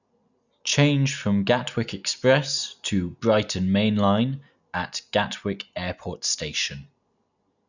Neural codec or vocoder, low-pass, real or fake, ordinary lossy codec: none; 7.2 kHz; real; none